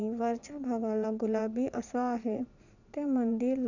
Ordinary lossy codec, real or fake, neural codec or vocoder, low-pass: none; fake; vocoder, 22.05 kHz, 80 mel bands, Vocos; 7.2 kHz